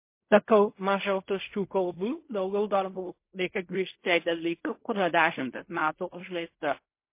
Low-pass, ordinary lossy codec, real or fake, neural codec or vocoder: 3.6 kHz; MP3, 24 kbps; fake; codec, 16 kHz in and 24 kHz out, 0.4 kbps, LongCat-Audio-Codec, fine tuned four codebook decoder